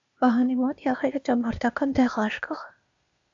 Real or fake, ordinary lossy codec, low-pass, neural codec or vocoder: fake; AAC, 64 kbps; 7.2 kHz; codec, 16 kHz, 0.8 kbps, ZipCodec